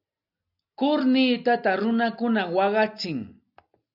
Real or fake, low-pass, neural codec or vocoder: real; 5.4 kHz; none